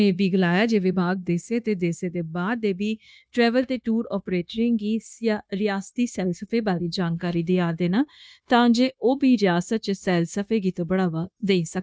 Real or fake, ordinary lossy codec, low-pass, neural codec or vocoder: fake; none; none; codec, 16 kHz, 0.9 kbps, LongCat-Audio-Codec